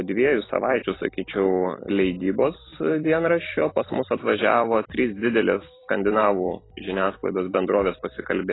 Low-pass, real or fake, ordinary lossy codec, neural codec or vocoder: 7.2 kHz; fake; AAC, 16 kbps; vocoder, 44.1 kHz, 128 mel bands every 256 samples, BigVGAN v2